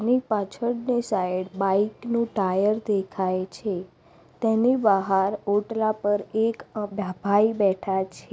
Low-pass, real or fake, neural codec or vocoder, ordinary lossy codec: none; real; none; none